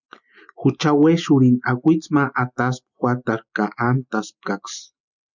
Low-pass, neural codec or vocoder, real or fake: 7.2 kHz; none; real